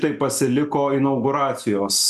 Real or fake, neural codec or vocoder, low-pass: real; none; 14.4 kHz